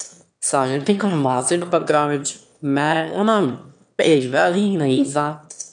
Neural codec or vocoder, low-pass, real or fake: autoencoder, 22.05 kHz, a latent of 192 numbers a frame, VITS, trained on one speaker; 9.9 kHz; fake